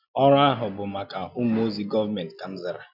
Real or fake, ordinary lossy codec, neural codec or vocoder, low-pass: real; none; none; 5.4 kHz